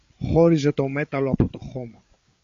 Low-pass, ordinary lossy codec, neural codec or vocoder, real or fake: 7.2 kHz; AAC, 48 kbps; codec, 16 kHz, 8 kbps, FreqCodec, larger model; fake